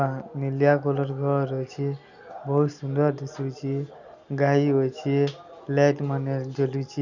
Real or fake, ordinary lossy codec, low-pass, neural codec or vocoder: real; none; 7.2 kHz; none